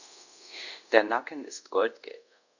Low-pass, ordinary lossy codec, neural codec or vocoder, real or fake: 7.2 kHz; none; codec, 24 kHz, 0.5 kbps, DualCodec; fake